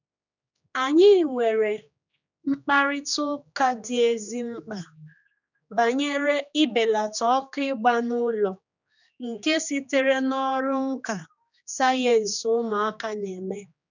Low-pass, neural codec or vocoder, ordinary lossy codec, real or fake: 7.2 kHz; codec, 16 kHz, 2 kbps, X-Codec, HuBERT features, trained on general audio; none; fake